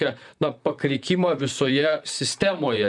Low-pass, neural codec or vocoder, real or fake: 10.8 kHz; vocoder, 44.1 kHz, 128 mel bands, Pupu-Vocoder; fake